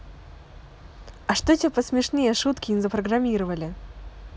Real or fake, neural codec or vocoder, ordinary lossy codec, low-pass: real; none; none; none